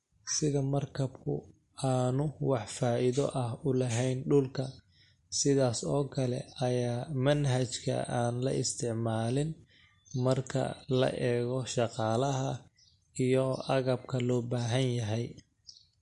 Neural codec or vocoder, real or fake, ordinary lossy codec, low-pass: none; real; MP3, 48 kbps; 14.4 kHz